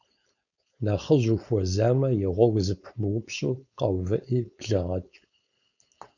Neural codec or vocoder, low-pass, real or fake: codec, 16 kHz, 4.8 kbps, FACodec; 7.2 kHz; fake